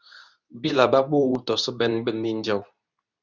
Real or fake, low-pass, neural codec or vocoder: fake; 7.2 kHz; codec, 24 kHz, 0.9 kbps, WavTokenizer, medium speech release version 2